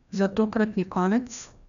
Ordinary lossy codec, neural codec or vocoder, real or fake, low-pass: none; codec, 16 kHz, 1 kbps, FreqCodec, larger model; fake; 7.2 kHz